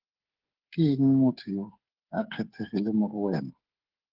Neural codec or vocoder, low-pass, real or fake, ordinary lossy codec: codec, 16 kHz, 8 kbps, FreqCodec, smaller model; 5.4 kHz; fake; Opus, 16 kbps